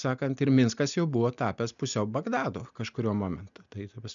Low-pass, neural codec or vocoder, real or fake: 7.2 kHz; none; real